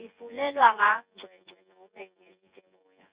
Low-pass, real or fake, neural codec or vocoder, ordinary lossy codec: 3.6 kHz; fake; vocoder, 24 kHz, 100 mel bands, Vocos; Opus, 64 kbps